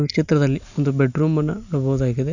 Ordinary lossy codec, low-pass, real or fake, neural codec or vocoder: none; 7.2 kHz; real; none